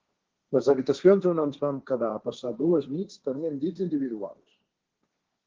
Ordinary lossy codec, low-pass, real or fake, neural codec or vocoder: Opus, 16 kbps; 7.2 kHz; fake; codec, 16 kHz, 1.1 kbps, Voila-Tokenizer